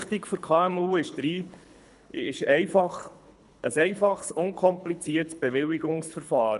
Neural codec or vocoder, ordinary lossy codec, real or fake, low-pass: codec, 24 kHz, 3 kbps, HILCodec; none; fake; 10.8 kHz